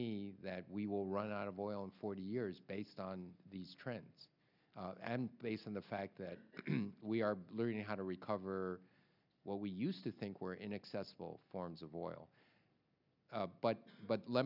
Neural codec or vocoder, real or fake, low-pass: none; real; 5.4 kHz